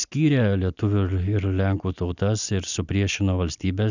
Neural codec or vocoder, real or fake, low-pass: none; real; 7.2 kHz